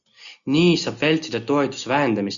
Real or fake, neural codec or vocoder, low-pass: real; none; 7.2 kHz